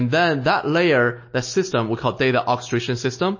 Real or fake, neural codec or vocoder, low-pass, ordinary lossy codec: real; none; 7.2 kHz; MP3, 32 kbps